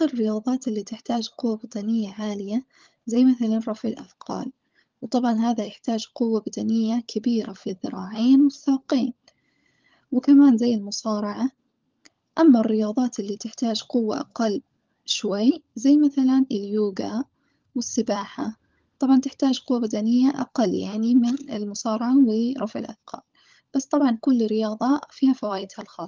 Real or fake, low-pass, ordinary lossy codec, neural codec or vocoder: fake; 7.2 kHz; Opus, 32 kbps; codec, 16 kHz, 16 kbps, FunCodec, trained on LibriTTS, 50 frames a second